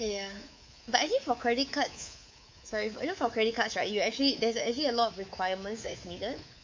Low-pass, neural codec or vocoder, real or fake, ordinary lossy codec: 7.2 kHz; codec, 24 kHz, 3.1 kbps, DualCodec; fake; MP3, 64 kbps